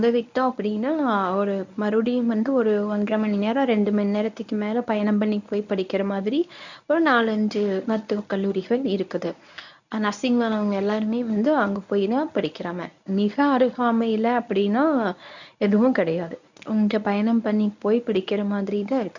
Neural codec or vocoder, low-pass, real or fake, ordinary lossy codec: codec, 24 kHz, 0.9 kbps, WavTokenizer, medium speech release version 1; 7.2 kHz; fake; none